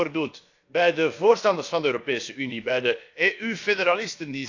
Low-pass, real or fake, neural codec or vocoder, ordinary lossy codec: 7.2 kHz; fake; codec, 16 kHz, about 1 kbps, DyCAST, with the encoder's durations; AAC, 48 kbps